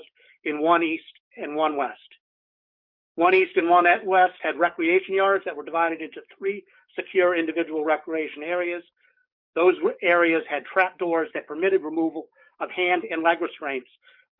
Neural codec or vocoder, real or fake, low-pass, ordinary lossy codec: codec, 44.1 kHz, 7.8 kbps, DAC; fake; 5.4 kHz; MP3, 48 kbps